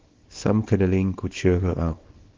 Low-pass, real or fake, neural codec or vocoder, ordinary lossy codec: 7.2 kHz; fake; codec, 24 kHz, 0.9 kbps, WavTokenizer, small release; Opus, 16 kbps